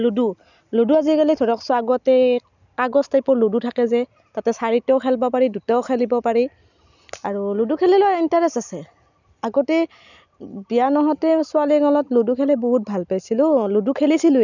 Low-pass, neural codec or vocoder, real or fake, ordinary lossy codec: 7.2 kHz; none; real; none